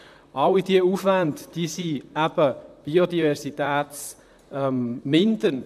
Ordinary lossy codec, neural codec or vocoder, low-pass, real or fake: none; vocoder, 44.1 kHz, 128 mel bands, Pupu-Vocoder; 14.4 kHz; fake